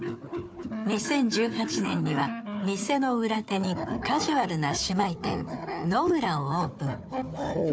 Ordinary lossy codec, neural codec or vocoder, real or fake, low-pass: none; codec, 16 kHz, 4 kbps, FunCodec, trained on Chinese and English, 50 frames a second; fake; none